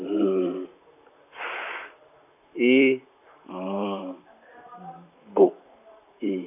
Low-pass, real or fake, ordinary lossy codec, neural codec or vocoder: 3.6 kHz; fake; none; vocoder, 44.1 kHz, 128 mel bands, Pupu-Vocoder